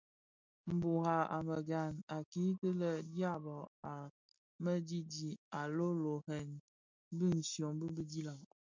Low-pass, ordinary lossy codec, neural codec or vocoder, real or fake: 7.2 kHz; MP3, 64 kbps; none; real